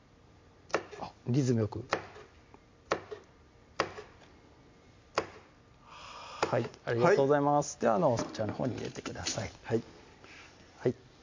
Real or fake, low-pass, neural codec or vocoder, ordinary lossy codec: real; 7.2 kHz; none; MP3, 48 kbps